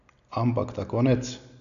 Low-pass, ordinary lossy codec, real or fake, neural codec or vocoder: 7.2 kHz; none; real; none